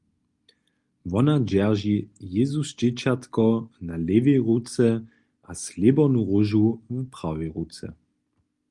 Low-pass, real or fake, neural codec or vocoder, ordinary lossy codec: 10.8 kHz; real; none; Opus, 32 kbps